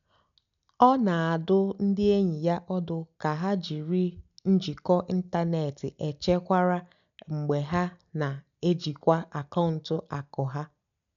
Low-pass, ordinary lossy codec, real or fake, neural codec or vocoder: 7.2 kHz; none; real; none